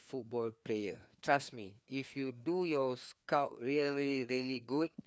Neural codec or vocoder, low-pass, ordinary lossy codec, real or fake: codec, 16 kHz, 2 kbps, FreqCodec, larger model; none; none; fake